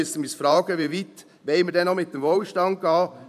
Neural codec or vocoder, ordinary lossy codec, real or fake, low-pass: vocoder, 44.1 kHz, 128 mel bands every 512 samples, BigVGAN v2; none; fake; 14.4 kHz